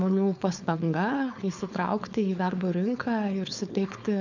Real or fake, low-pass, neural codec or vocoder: fake; 7.2 kHz; codec, 16 kHz, 4.8 kbps, FACodec